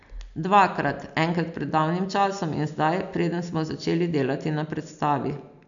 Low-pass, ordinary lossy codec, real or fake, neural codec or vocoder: 7.2 kHz; none; real; none